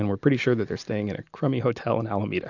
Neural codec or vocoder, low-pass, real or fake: none; 7.2 kHz; real